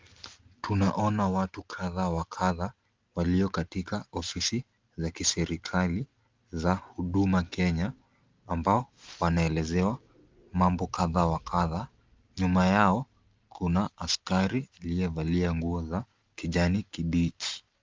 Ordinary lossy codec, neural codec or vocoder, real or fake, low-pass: Opus, 24 kbps; none; real; 7.2 kHz